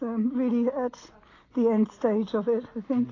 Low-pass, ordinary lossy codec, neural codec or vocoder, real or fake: 7.2 kHz; AAC, 32 kbps; none; real